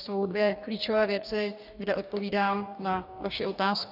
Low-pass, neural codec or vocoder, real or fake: 5.4 kHz; codec, 44.1 kHz, 2.6 kbps, DAC; fake